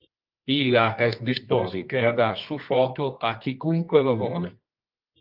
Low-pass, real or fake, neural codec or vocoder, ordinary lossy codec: 5.4 kHz; fake; codec, 24 kHz, 0.9 kbps, WavTokenizer, medium music audio release; Opus, 24 kbps